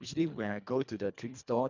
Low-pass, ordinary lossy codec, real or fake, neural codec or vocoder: 7.2 kHz; none; fake; codec, 24 kHz, 1.5 kbps, HILCodec